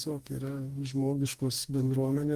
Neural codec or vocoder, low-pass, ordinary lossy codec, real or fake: codec, 44.1 kHz, 2.6 kbps, DAC; 14.4 kHz; Opus, 24 kbps; fake